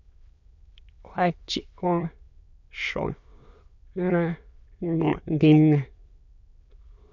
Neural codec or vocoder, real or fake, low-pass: autoencoder, 22.05 kHz, a latent of 192 numbers a frame, VITS, trained on many speakers; fake; 7.2 kHz